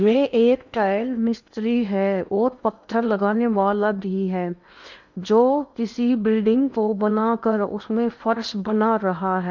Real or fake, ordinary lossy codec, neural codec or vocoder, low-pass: fake; none; codec, 16 kHz in and 24 kHz out, 0.8 kbps, FocalCodec, streaming, 65536 codes; 7.2 kHz